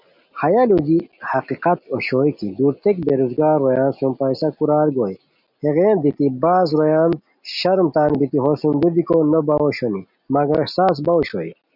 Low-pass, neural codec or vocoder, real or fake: 5.4 kHz; none; real